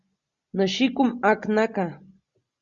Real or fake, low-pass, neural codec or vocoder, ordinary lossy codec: real; 7.2 kHz; none; Opus, 64 kbps